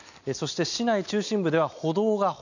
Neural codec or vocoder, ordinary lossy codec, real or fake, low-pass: none; none; real; 7.2 kHz